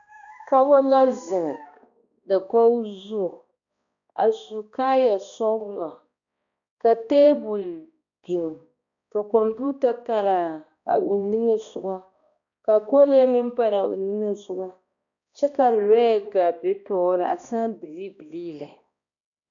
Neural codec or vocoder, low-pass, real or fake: codec, 16 kHz, 1 kbps, X-Codec, HuBERT features, trained on balanced general audio; 7.2 kHz; fake